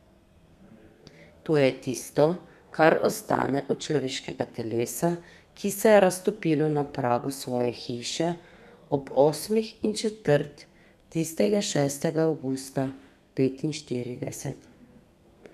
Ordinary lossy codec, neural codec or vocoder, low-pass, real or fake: none; codec, 32 kHz, 1.9 kbps, SNAC; 14.4 kHz; fake